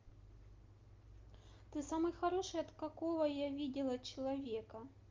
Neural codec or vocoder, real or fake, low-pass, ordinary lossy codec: none; real; 7.2 kHz; Opus, 32 kbps